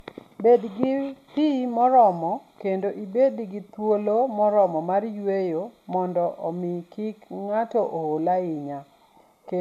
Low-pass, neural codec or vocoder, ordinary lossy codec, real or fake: 14.4 kHz; none; none; real